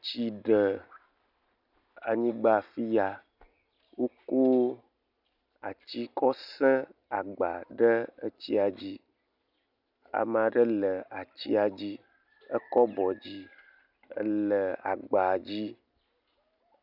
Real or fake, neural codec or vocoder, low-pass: real; none; 5.4 kHz